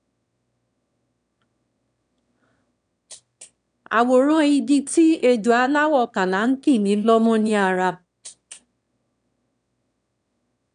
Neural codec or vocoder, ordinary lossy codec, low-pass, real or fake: autoencoder, 22.05 kHz, a latent of 192 numbers a frame, VITS, trained on one speaker; none; 9.9 kHz; fake